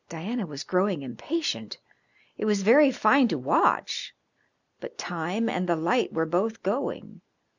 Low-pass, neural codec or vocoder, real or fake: 7.2 kHz; none; real